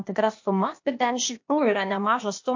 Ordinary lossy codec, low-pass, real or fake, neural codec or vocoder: MP3, 48 kbps; 7.2 kHz; fake; codec, 16 kHz, 0.8 kbps, ZipCodec